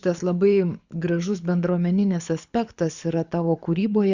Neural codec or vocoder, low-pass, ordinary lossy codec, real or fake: codec, 24 kHz, 6 kbps, HILCodec; 7.2 kHz; Opus, 64 kbps; fake